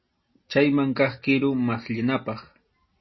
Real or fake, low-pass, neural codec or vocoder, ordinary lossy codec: real; 7.2 kHz; none; MP3, 24 kbps